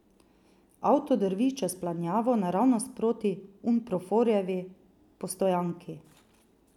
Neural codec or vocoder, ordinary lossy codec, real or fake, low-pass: none; none; real; 19.8 kHz